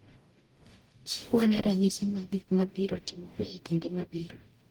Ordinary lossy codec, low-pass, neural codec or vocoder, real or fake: Opus, 24 kbps; 19.8 kHz; codec, 44.1 kHz, 0.9 kbps, DAC; fake